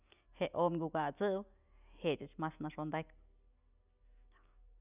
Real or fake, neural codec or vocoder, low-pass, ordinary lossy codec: real; none; 3.6 kHz; none